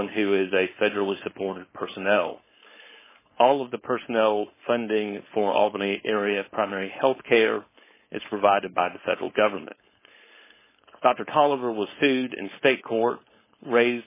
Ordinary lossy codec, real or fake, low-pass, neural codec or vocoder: MP3, 16 kbps; fake; 3.6 kHz; codec, 16 kHz, 4.8 kbps, FACodec